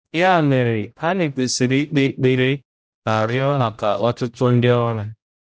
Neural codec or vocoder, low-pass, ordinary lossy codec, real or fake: codec, 16 kHz, 0.5 kbps, X-Codec, HuBERT features, trained on general audio; none; none; fake